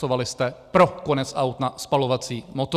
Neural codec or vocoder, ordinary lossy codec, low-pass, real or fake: none; Opus, 64 kbps; 14.4 kHz; real